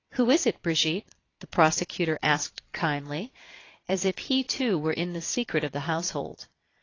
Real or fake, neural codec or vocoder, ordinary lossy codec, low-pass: fake; vocoder, 22.05 kHz, 80 mel bands, Vocos; AAC, 32 kbps; 7.2 kHz